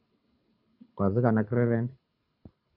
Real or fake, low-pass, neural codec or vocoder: fake; 5.4 kHz; codec, 24 kHz, 6 kbps, HILCodec